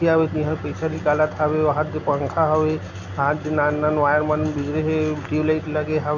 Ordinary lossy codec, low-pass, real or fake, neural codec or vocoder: none; 7.2 kHz; real; none